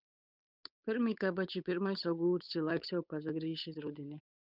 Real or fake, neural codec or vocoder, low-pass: fake; codec, 16 kHz, 8 kbps, FunCodec, trained on Chinese and English, 25 frames a second; 5.4 kHz